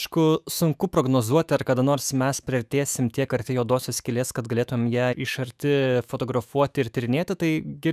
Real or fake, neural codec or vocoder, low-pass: fake; autoencoder, 48 kHz, 128 numbers a frame, DAC-VAE, trained on Japanese speech; 14.4 kHz